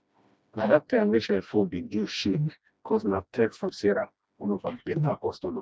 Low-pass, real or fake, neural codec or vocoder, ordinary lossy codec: none; fake; codec, 16 kHz, 1 kbps, FreqCodec, smaller model; none